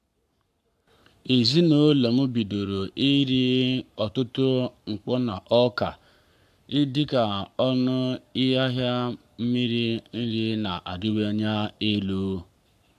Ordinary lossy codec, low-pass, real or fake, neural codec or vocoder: AAC, 96 kbps; 14.4 kHz; fake; codec, 44.1 kHz, 7.8 kbps, Pupu-Codec